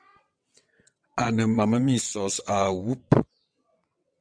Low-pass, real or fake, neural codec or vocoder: 9.9 kHz; fake; vocoder, 22.05 kHz, 80 mel bands, WaveNeXt